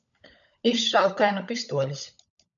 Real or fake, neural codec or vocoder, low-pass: fake; codec, 16 kHz, 16 kbps, FunCodec, trained on LibriTTS, 50 frames a second; 7.2 kHz